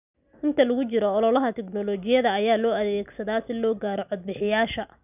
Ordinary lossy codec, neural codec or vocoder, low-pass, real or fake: none; none; 3.6 kHz; real